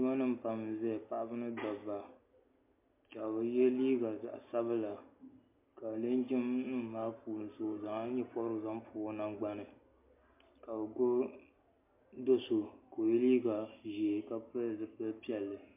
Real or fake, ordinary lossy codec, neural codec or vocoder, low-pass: real; MP3, 32 kbps; none; 3.6 kHz